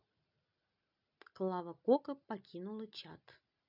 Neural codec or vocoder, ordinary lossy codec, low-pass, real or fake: none; none; 5.4 kHz; real